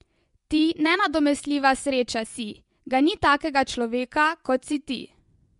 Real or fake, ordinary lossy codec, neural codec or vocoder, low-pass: fake; MP3, 64 kbps; vocoder, 24 kHz, 100 mel bands, Vocos; 10.8 kHz